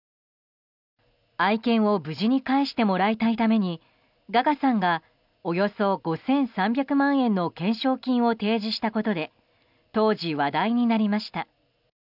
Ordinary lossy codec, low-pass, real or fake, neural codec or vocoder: none; 5.4 kHz; real; none